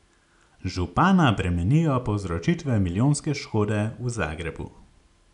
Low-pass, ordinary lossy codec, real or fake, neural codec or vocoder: 10.8 kHz; none; real; none